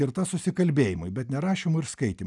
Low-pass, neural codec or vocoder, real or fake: 10.8 kHz; none; real